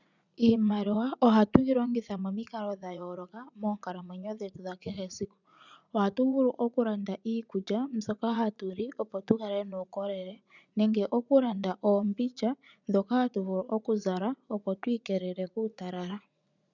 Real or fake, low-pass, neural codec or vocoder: fake; 7.2 kHz; vocoder, 44.1 kHz, 80 mel bands, Vocos